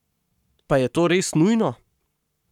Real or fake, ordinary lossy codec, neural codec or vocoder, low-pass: fake; none; codec, 44.1 kHz, 7.8 kbps, Pupu-Codec; 19.8 kHz